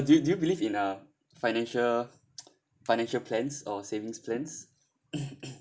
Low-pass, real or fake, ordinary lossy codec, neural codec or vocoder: none; real; none; none